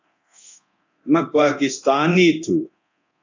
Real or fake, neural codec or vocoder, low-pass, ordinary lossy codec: fake; codec, 24 kHz, 0.9 kbps, DualCodec; 7.2 kHz; AAC, 48 kbps